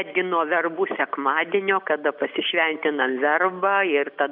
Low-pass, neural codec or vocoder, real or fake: 5.4 kHz; none; real